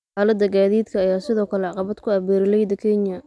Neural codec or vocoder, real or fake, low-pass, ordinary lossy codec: none; real; none; none